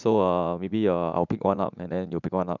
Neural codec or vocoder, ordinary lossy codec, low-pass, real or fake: vocoder, 44.1 kHz, 80 mel bands, Vocos; none; 7.2 kHz; fake